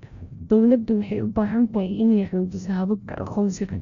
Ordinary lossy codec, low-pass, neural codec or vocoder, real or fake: none; 7.2 kHz; codec, 16 kHz, 0.5 kbps, FreqCodec, larger model; fake